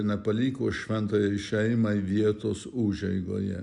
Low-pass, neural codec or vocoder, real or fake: 10.8 kHz; none; real